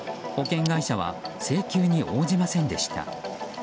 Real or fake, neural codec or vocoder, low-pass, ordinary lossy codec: real; none; none; none